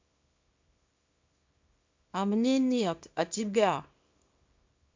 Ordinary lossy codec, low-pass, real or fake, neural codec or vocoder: MP3, 64 kbps; 7.2 kHz; fake; codec, 24 kHz, 0.9 kbps, WavTokenizer, small release